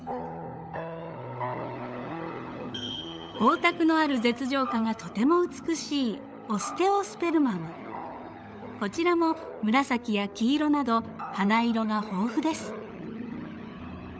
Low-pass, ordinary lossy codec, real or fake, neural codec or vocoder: none; none; fake; codec, 16 kHz, 16 kbps, FunCodec, trained on LibriTTS, 50 frames a second